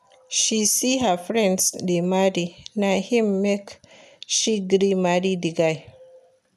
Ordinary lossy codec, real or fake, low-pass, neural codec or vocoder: none; real; 14.4 kHz; none